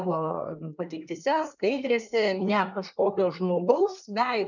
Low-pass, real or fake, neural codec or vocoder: 7.2 kHz; fake; codec, 24 kHz, 1 kbps, SNAC